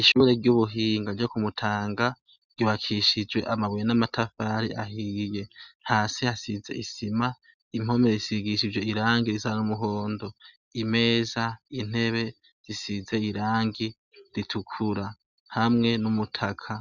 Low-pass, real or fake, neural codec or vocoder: 7.2 kHz; real; none